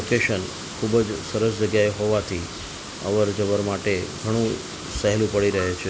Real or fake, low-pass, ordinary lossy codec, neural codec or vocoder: real; none; none; none